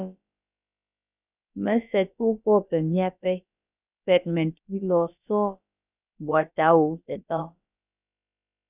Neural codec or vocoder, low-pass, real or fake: codec, 16 kHz, about 1 kbps, DyCAST, with the encoder's durations; 3.6 kHz; fake